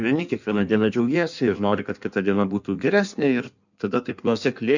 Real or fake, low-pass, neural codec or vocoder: fake; 7.2 kHz; codec, 16 kHz in and 24 kHz out, 1.1 kbps, FireRedTTS-2 codec